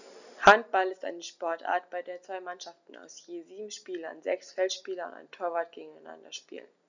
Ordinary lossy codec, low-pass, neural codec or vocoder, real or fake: none; 7.2 kHz; none; real